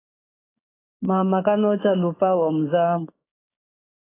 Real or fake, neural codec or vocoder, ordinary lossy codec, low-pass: fake; codec, 16 kHz, 6 kbps, DAC; AAC, 16 kbps; 3.6 kHz